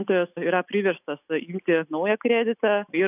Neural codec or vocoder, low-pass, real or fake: none; 3.6 kHz; real